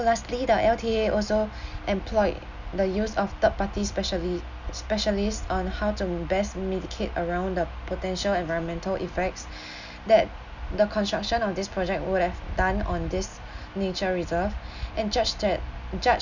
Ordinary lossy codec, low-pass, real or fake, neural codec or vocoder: none; 7.2 kHz; real; none